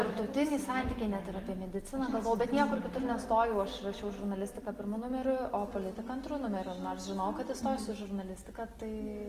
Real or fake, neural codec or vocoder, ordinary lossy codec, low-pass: real; none; Opus, 24 kbps; 14.4 kHz